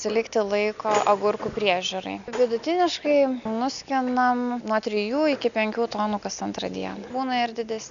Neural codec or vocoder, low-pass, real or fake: none; 7.2 kHz; real